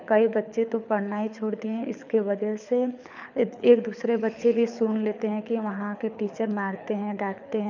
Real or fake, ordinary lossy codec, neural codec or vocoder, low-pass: fake; none; codec, 24 kHz, 6 kbps, HILCodec; 7.2 kHz